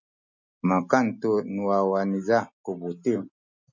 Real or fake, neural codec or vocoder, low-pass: real; none; 7.2 kHz